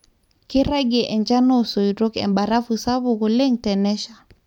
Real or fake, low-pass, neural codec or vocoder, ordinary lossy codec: real; 19.8 kHz; none; none